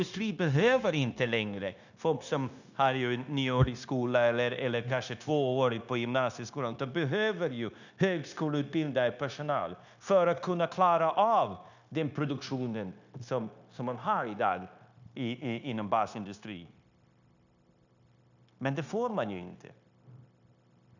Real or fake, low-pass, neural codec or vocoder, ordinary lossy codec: fake; 7.2 kHz; codec, 16 kHz, 0.9 kbps, LongCat-Audio-Codec; none